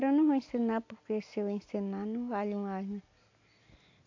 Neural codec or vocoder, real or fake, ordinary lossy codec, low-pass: none; real; AAC, 48 kbps; 7.2 kHz